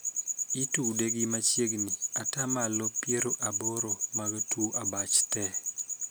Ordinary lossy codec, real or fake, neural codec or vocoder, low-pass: none; real; none; none